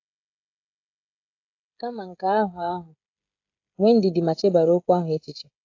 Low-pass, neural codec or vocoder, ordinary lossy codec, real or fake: 7.2 kHz; codec, 16 kHz, 16 kbps, FreqCodec, smaller model; AAC, 48 kbps; fake